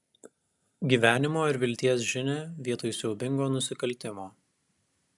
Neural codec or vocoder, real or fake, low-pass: none; real; 10.8 kHz